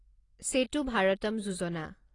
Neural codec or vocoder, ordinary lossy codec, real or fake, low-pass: none; AAC, 32 kbps; real; 10.8 kHz